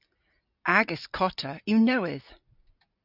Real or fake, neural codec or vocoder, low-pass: real; none; 5.4 kHz